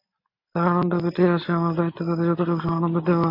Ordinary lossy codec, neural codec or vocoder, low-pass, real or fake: AAC, 32 kbps; none; 5.4 kHz; real